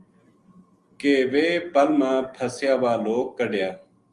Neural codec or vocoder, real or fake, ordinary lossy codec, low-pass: none; real; Opus, 32 kbps; 10.8 kHz